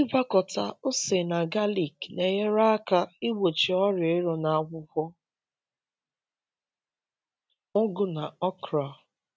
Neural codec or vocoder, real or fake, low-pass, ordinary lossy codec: none; real; none; none